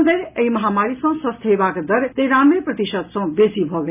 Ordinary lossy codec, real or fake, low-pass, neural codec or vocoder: none; real; 3.6 kHz; none